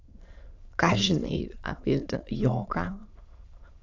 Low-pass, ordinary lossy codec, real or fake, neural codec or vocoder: 7.2 kHz; AAC, 48 kbps; fake; autoencoder, 22.05 kHz, a latent of 192 numbers a frame, VITS, trained on many speakers